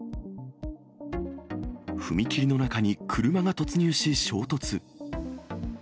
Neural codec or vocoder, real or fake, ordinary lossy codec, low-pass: none; real; none; none